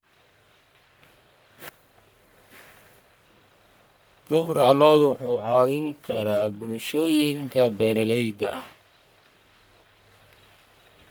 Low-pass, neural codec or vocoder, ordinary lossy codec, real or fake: none; codec, 44.1 kHz, 1.7 kbps, Pupu-Codec; none; fake